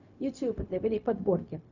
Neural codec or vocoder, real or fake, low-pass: codec, 16 kHz, 0.4 kbps, LongCat-Audio-Codec; fake; 7.2 kHz